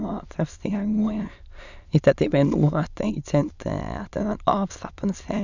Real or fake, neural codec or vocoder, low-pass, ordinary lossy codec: fake; autoencoder, 22.05 kHz, a latent of 192 numbers a frame, VITS, trained on many speakers; 7.2 kHz; none